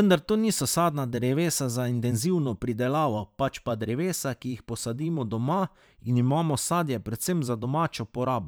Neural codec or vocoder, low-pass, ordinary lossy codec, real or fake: vocoder, 44.1 kHz, 128 mel bands every 512 samples, BigVGAN v2; none; none; fake